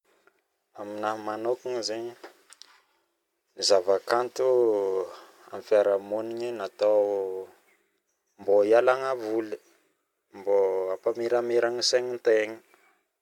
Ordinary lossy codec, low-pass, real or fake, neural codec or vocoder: MP3, 96 kbps; 19.8 kHz; fake; vocoder, 44.1 kHz, 128 mel bands every 256 samples, BigVGAN v2